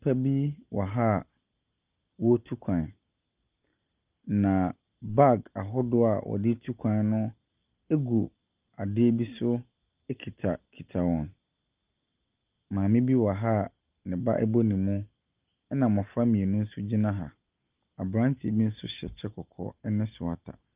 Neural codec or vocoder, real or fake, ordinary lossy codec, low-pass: none; real; Opus, 24 kbps; 3.6 kHz